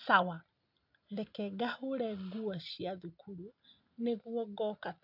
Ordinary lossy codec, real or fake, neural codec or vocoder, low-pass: none; real; none; 5.4 kHz